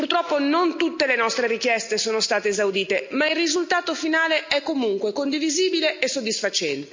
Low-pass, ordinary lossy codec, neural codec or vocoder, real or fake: 7.2 kHz; MP3, 64 kbps; none; real